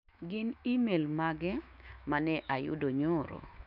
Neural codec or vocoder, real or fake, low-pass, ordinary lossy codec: autoencoder, 48 kHz, 128 numbers a frame, DAC-VAE, trained on Japanese speech; fake; 5.4 kHz; none